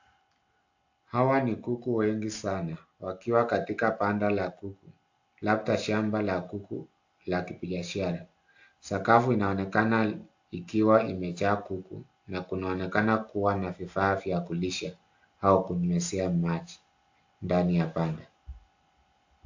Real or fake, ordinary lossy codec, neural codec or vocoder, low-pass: real; AAC, 48 kbps; none; 7.2 kHz